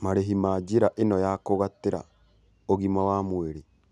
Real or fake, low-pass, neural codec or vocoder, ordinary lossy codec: real; none; none; none